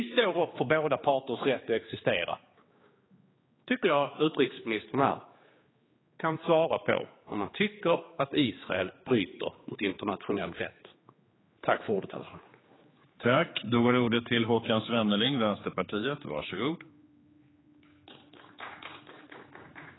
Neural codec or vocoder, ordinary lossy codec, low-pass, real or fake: codec, 16 kHz, 4 kbps, X-Codec, HuBERT features, trained on general audio; AAC, 16 kbps; 7.2 kHz; fake